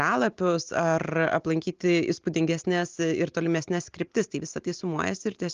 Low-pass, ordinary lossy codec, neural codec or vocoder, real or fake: 7.2 kHz; Opus, 32 kbps; none; real